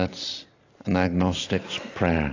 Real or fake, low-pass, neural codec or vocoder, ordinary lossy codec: real; 7.2 kHz; none; AAC, 32 kbps